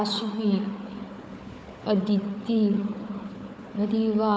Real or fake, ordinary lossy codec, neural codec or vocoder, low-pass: fake; none; codec, 16 kHz, 4 kbps, FunCodec, trained on Chinese and English, 50 frames a second; none